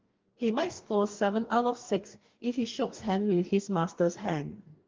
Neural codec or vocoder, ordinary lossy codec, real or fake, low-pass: codec, 44.1 kHz, 2.6 kbps, DAC; Opus, 32 kbps; fake; 7.2 kHz